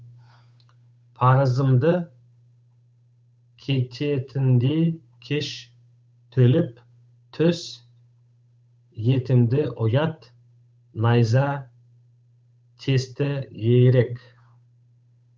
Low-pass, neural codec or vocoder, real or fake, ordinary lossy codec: none; codec, 16 kHz, 8 kbps, FunCodec, trained on Chinese and English, 25 frames a second; fake; none